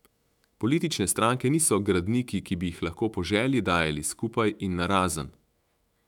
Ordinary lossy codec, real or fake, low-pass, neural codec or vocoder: none; fake; 19.8 kHz; autoencoder, 48 kHz, 128 numbers a frame, DAC-VAE, trained on Japanese speech